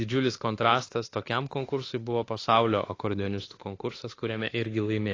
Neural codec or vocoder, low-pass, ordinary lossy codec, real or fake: autoencoder, 48 kHz, 32 numbers a frame, DAC-VAE, trained on Japanese speech; 7.2 kHz; AAC, 32 kbps; fake